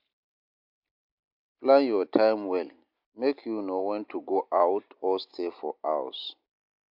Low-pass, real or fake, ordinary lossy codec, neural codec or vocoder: 5.4 kHz; real; MP3, 48 kbps; none